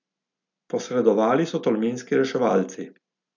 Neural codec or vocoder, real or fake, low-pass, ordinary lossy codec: none; real; 7.2 kHz; MP3, 64 kbps